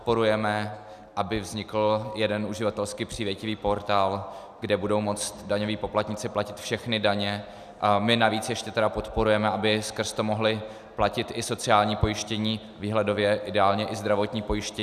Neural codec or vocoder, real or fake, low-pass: none; real; 14.4 kHz